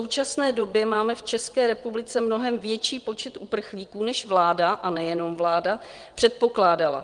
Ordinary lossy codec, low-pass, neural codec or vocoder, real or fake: Opus, 24 kbps; 9.9 kHz; vocoder, 22.05 kHz, 80 mel bands, WaveNeXt; fake